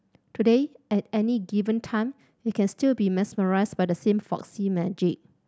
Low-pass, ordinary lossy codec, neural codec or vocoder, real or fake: none; none; none; real